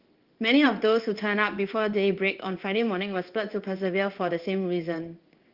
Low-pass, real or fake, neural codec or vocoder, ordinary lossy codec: 5.4 kHz; fake; codec, 16 kHz in and 24 kHz out, 1 kbps, XY-Tokenizer; Opus, 32 kbps